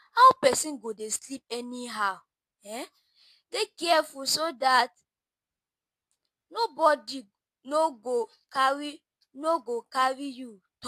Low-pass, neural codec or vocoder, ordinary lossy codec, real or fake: 14.4 kHz; none; AAC, 64 kbps; real